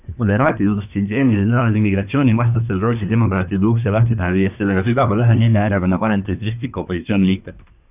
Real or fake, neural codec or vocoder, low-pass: fake; codec, 24 kHz, 1 kbps, SNAC; 3.6 kHz